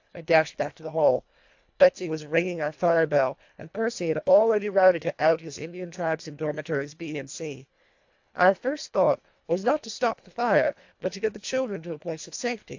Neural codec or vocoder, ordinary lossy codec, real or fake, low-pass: codec, 24 kHz, 1.5 kbps, HILCodec; AAC, 48 kbps; fake; 7.2 kHz